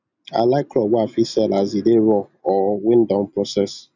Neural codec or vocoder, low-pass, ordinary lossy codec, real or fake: none; 7.2 kHz; none; real